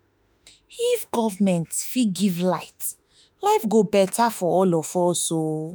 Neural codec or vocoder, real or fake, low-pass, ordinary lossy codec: autoencoder, 48 kHz, 32 numbers a frame, DAC-VAE, trained on Japanese speech; fake; none; none